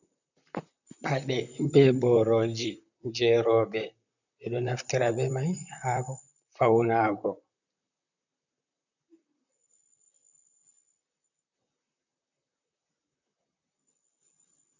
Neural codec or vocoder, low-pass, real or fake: vocoder, 44.1 kHz, 128 mel bands, Pupu-Vocoder; 7.2 kHz; fake